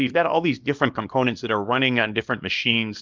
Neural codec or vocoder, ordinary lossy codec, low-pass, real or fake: codec, 24 kHz, 0.9 kbps, WavTokenizer, small release; Opus, 24 kbps; 7.2 kHz; fake